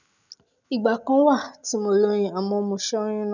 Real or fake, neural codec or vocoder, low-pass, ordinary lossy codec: real; none; 7.2 kHz; none